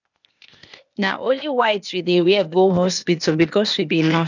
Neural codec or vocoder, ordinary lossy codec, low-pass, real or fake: codec, 16 kHz, 0.8 kbps, ZipCodec; none; 7.2 kHz; fake